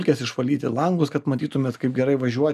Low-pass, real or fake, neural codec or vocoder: 14.4 kHz; real; none